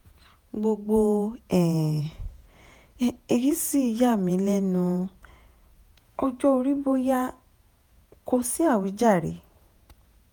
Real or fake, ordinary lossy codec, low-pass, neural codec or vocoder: fake; none; none; vocoder, 48 kHz, 128 mel bands, Vocos